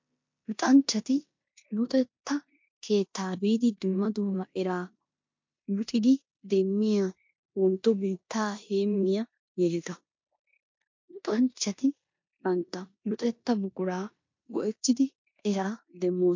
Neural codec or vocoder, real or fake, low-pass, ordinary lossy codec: codec, 16 kHz in and 24 kHz out, 0.9 kbps, LongCat-Audio-Codec, four codebook decoder; fake; 7.2 kHz; MP3, 48 kbps